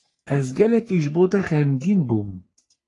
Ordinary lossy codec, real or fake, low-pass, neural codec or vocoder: AAC, 48 kbps; fake; 10.8 kHz; codec, 44.1 kHz, 3.4 kbps, Pupu-Codec